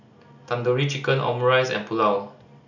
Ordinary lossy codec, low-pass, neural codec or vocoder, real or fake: none; 7.2 kHz; none; real